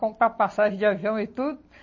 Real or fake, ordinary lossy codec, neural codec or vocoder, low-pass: real; MP3, 32 kbps; none; 7.2 kHz